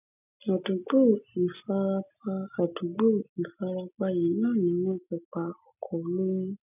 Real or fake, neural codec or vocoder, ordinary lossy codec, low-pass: real; none; none; 3.6 kHz